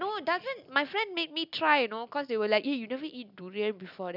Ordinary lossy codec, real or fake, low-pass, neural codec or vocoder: none; real; 5.4 kHz; none